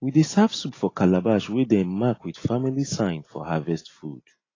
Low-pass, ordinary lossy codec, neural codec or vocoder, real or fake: 7.2 kHz; AAC, 32 kbps; none; real